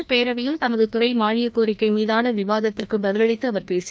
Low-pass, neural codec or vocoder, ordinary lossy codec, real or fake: none; codec, 16 kHz, 1 kbps, FreqCodec, larger model; none; fake